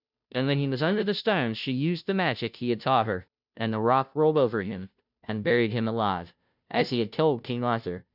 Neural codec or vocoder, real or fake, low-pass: codec, 16 kHz, 0.5 kbps, FunCodec, trained on Chinese and English, 25 frames a second; fake; 5.4 kHz